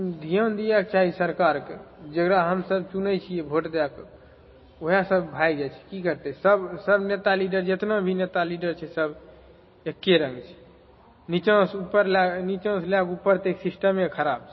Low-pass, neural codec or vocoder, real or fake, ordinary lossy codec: 7.2 kHz; none; real; MP3, 24 kbps